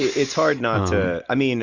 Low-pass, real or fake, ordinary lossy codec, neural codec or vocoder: 7.2 kHz; real; MP3, 64 kbps; none